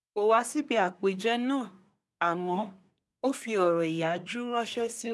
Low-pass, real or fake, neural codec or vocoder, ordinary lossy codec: none; fake; codec, 24 kHz, 1 kbps, SNAC; none